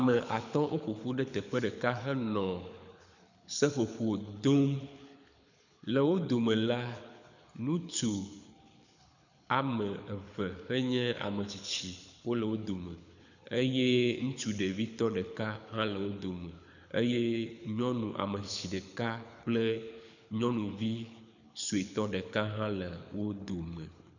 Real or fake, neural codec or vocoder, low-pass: fake; codec, 24 kHz, 6 kbps, HILCodec; 7.2 kHz